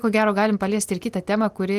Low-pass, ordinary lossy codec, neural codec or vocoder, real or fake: 14.4 kHz; Opus, 24 kbps; none; real